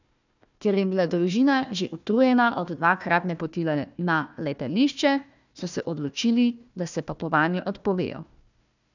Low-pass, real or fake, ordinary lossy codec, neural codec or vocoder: 7.2 kHz; fake; none; codec, 16 kHz, 1 kbps, FunCodec, trained on Chinese and English, 50 frames a second